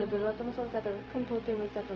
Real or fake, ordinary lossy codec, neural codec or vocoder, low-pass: fake; none; codec, 16 kHz, 0.4 kbps, LongCat-Audio-Codec; none